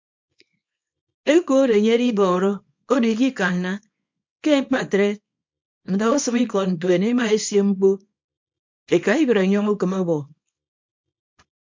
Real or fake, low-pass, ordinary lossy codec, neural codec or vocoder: fake; 7.2 kHz; MP3, 48 kbps; codec, 24 kHz, 0.9 kbps, WavTokenizer, small release